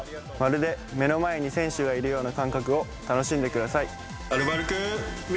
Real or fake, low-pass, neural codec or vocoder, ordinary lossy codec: real; none; none; none